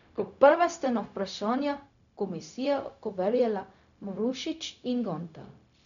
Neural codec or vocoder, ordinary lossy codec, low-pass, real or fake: codec, 16 kHz, 0.4 kbps, LongCat-Audio-Codec; none; 7.2 kHz; fake